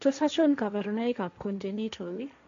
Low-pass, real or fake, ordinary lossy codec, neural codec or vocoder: 7.2 kHz; fake; MP3, 64 kbps; codec, 16 kHz, 1.1 kbps, Voila-Tokenizer